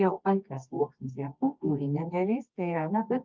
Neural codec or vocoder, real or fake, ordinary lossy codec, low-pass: codec, 24 kHz, 0.9 kbps, WavTokenizer, medium music audio release; fake; Opus, 32 kbps; 7.2 kHz